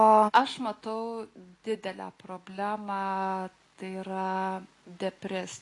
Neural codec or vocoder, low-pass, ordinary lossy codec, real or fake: none; 10.8 kHz; AAC, 48 kbps; real